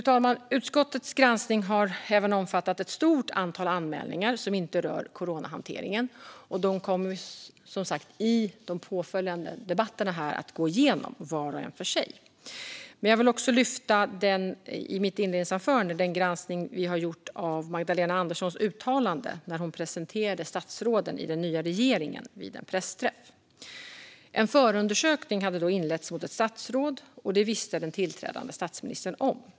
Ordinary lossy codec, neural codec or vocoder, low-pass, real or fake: none; none; none; real